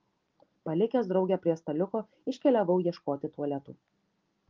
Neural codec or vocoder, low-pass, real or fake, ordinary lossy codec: none; 7.2 kHz; real; Opus, 32 kbps